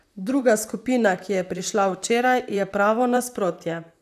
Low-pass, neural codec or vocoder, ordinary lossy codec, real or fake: 14.4 kHz; vocoder, 44.1 kHz, 128 mel bands, Pupu-Vocoder; none; fake